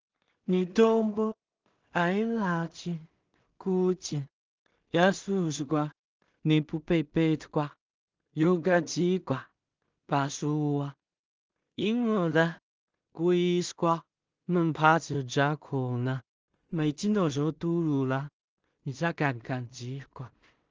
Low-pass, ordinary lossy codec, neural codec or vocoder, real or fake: 7.2 kHz; Opus, 24 kbps; codec, 16 kHz in and 24 kHz out, 0.4 kbps, LongCat-Audio-Codec, two codebook decoder; fake